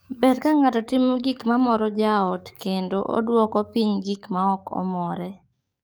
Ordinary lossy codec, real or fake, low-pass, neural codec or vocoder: none; fake; none; codec, 44.1 kHz, 7.8 kbps, DAC